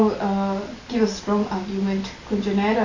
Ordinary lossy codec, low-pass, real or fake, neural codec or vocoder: AAC, 32 kbps; 7.2 kHz; real; none